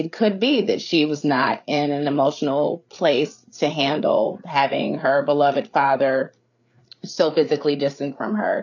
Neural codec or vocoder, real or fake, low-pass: codec, 16 kHz, 8 kbps, FreqCodec, larger model; fake; 7.2 kHz